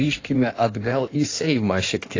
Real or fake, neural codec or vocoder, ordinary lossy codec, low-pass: fake; codec, 16 kHz in and 24 kHz out, 1.1 kbps, FireRedTTS-2 codec; AAC, 32 kbps; 7.2 kHz